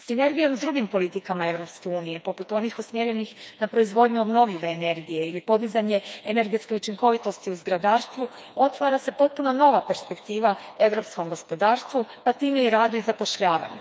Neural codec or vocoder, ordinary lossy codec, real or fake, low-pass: codec, 16 kHz, 2 kbps, FreqCodec, smaller model; none; fake; none